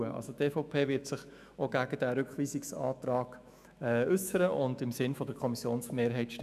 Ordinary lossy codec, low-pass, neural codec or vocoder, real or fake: none; 14.4 kHz; autoencoder, 48 kHz, 128 numbers a frame, DAC-VAE, trained on Japanese speech; fake